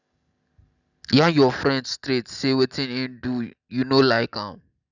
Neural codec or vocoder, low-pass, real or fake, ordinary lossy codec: none; 7.2 kHz; real; none